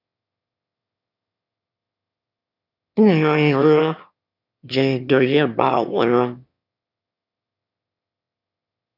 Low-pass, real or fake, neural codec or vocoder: 5.4 kHz; fake; autoencoder, 22.05 kHz, a latent of 192 numbers a frame, VITS, trained on one speaker